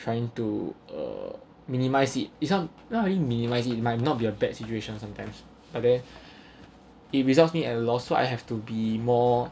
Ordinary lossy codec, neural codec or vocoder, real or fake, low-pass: none; none; real; none